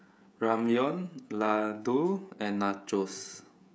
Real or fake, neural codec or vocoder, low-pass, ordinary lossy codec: fake; codec, 16 kHz, 16 kbps, FreqCodec, smaller model; none; none